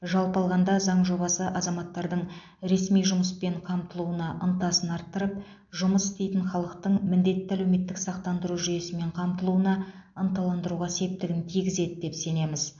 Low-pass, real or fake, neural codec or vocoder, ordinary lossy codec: 7.2 kHz; real; none; AAC, 64 kbps